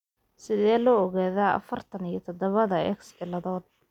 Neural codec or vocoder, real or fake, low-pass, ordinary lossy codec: none; real; 19.8 kHz; none